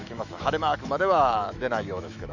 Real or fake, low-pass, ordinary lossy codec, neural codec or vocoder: fake; 7.2 kHz; none; autoencoder, 48 kHz, 128 numbers a frame, DAC-VAE, trained on Japanese speech